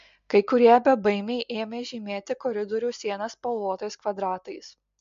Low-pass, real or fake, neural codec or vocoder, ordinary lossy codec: 7.2 kHz; real; none; MP3, 48 kbps